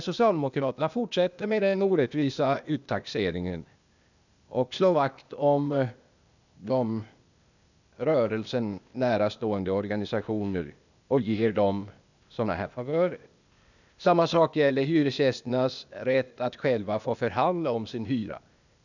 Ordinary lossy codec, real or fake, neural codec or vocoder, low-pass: none; fake; codec, 16 kHz, 0.8 kbps, ZipCodec; 7.2 kHz